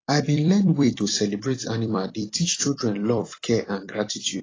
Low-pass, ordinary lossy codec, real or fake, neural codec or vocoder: 7.2 kHz; AAC, 32 kbps; fake; vocoder, 44.1 kHz, 128 mel bands every 256 samples, BigVGAN v2